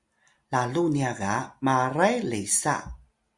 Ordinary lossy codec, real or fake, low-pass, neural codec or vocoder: Opus, 64 kbps; real; 10.8 kHz; none